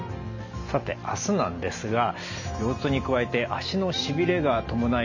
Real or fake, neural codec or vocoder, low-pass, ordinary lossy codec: real; none; 7.2 kHz; none